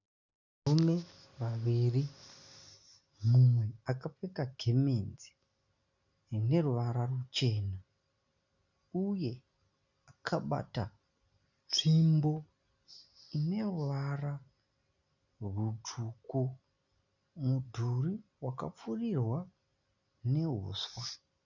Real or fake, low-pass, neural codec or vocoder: real; 7.2 kHz; none